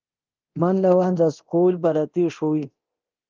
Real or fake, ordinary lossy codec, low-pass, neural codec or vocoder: fake; Opus, 32 kbps; 7.2 kHz; codec, 24 kHz, 0.9 kbps, DualCodec